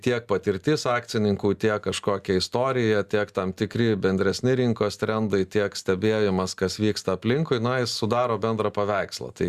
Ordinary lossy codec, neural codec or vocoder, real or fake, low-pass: Opus, 64 kbps; none; real; 14.4 kHz